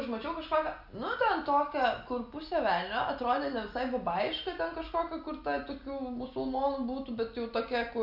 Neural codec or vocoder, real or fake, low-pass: none; real; 5.4 kHz